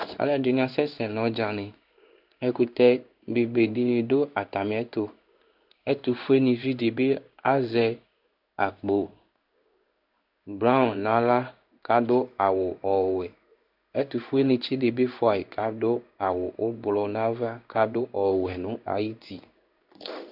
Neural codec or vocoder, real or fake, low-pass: codec, 16 kHz in and 24 kHz out, 1 kbps, XY-Tokenizer; fake; 5.4 kHz